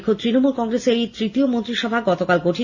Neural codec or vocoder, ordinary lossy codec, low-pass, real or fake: none; Opus, 64 kbps; 7.2 kHz; real